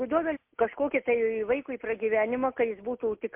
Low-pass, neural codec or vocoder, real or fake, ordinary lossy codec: 3.6 kHz; none; real; MP3, 32 kbps